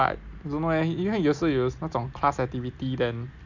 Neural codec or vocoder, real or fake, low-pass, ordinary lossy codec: none; real; 7.2 kHz; none